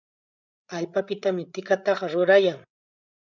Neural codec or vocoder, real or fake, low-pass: codec, 16 kHz, 8 kbps, FreqCodec, larger model; fake; 7.2 kHz